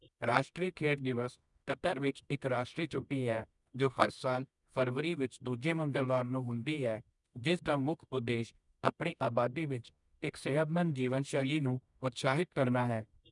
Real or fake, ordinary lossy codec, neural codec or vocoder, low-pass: fake; none; codec, 24 kHz, 0.9 kbps, WavTokenizer, medium music audio release; 10.8 kHz